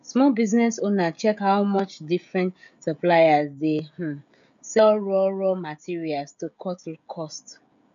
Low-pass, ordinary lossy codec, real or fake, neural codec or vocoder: 7.2 kHz; none; fake; codec, 16 kHz, 16 kbps, FreqCodec, smaller model